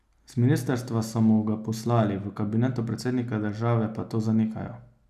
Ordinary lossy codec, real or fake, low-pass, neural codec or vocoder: none; real; 14.4 kHz; none